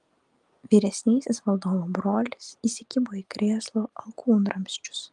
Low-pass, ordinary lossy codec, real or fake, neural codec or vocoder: 10.8 kHz; Opus, 32 kbps; real; none